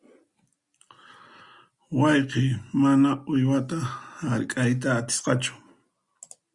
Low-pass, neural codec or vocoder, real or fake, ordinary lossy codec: 10.8 kHz; none; real; Opus, 64 kbps